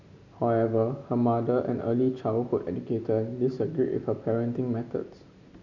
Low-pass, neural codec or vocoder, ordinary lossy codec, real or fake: 7.2 kHz; none; none; real